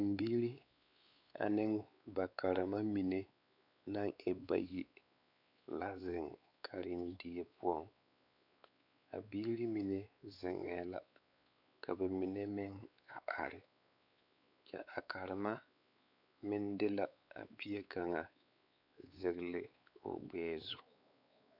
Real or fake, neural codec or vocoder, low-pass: fake; codec, 16 kHz, 4 kbps, X-Codec, WavLM features, trained on Multilingual LibriSpeech; 5.4 kHz